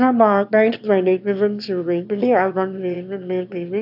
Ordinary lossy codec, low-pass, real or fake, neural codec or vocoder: AAC, 48 kbps; 5.4 kHz; fake; autoencoder, 22.05 kHz, a latent of 192 numbers a frame, VITS, trained on one speaker